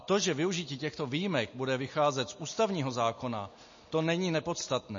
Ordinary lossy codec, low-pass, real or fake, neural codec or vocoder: MP3, 32 kbps; 7.2 kHz; real; none